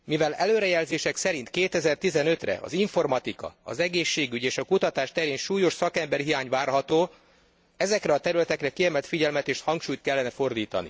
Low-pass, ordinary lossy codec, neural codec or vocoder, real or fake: none; none; none; real